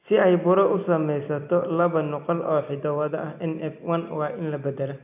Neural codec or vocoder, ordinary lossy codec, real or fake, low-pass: none; none; real; 3.6 kHz